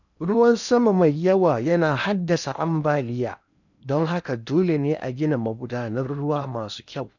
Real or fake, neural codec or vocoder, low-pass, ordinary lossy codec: fake; codec, 16 kHz in and 24 kHz out, 0.8 kbps, FocalCodec, streaming, 65536 codes; 7.2 kHz; none